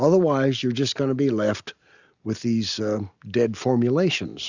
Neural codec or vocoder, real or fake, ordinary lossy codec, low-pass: none; real; Opus, 64 kbps; 7.2 kHz